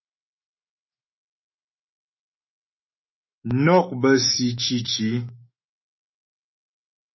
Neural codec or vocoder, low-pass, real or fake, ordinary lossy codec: autoencoder, 48 kHz, 128 numbers a frame, DAC-VAE, trained on Japanese speech; 7.2 kHz; fake; MP3, 24 kbps